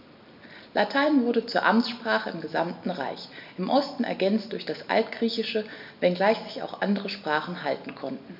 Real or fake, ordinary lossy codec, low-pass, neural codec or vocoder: real; MP3, 48 kbps; 5.4 kHz; none